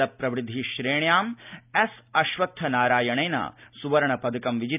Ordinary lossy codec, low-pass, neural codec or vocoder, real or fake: none; 3.6 kHz; none; real